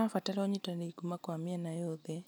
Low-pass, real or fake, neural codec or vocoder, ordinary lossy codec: none; real; none; none